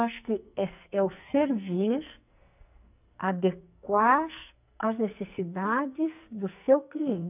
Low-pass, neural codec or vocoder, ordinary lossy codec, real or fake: 3.6 kHz; codec, 44.1 kHz, 2.6 kbps, SNAC; none; fake